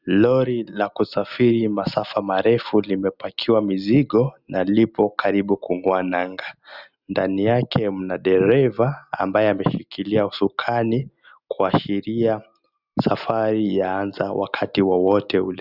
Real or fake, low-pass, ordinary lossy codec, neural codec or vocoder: real; 5.4 kHz; Opus, 64 kbps; none